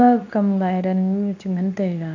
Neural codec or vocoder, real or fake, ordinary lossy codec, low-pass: codec, 24 kHz, 0.9 kbps, WavTokenizer, medium speech release version 2; fake; none; 7.2 kHz